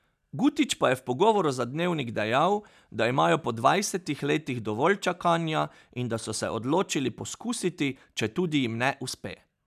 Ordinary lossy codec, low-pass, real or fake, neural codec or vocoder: none; 14.4 kHz; real; none